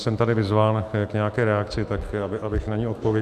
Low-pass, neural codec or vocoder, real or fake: 14.4 kHz; autoencoder, 48 kHz, 128 numbers a frame, DAC-VAE, trained on Japanese speech; fake